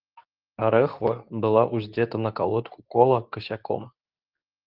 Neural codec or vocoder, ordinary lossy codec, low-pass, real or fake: codec, 24 kHz, 0.9 kbps, WavTokenizer, medium speech release version 2; Opus, 32 kbps; 5.4 kHz; fake